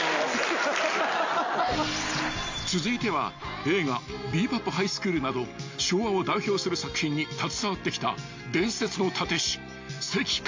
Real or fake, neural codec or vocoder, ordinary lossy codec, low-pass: real; none; MP3, 48 kbps; 7.2 kHz